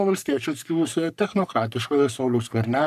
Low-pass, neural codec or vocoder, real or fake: 14.4 kHz; codec, 44.1 kHz, 3.4 kbps, Pupu-Codec; fake